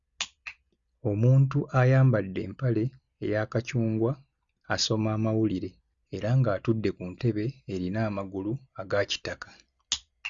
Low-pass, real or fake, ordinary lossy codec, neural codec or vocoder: 7.2 kHz; real; Opus, 64 kbps; none